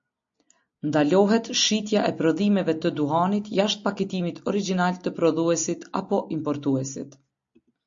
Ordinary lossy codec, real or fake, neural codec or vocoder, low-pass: MP3, 48 kbps; real; none; 7.2 kHz